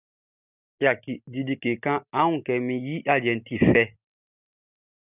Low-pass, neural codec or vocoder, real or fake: 3.6 kHz; none; real